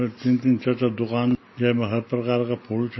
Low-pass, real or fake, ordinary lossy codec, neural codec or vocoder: 7.2 kHz; real; MP3, 24 kbps; none